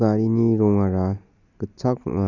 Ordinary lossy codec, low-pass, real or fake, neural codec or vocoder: none; 7.2 kHz; real; none